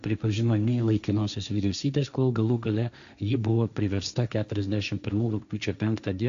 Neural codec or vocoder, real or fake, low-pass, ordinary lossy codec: codec, 16 kHz, 1.1 kbps, Voila-Tokenizer; fake; 7.2 kHz; AAC, 96 kbps